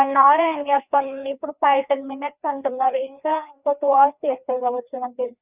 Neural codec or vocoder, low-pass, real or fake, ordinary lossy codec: codec, 16 kHz, 2 kbps, FreqCodec, larger model; 3.6 kHz; fake; none